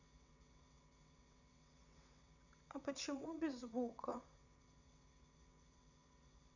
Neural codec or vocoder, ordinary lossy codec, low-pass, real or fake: vocoder, 22.05 kHz, 80 mel bands, WaveNeXt; none; 7.2 kHz; fake